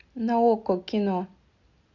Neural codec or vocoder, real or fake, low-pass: none; real; 7.2 kHz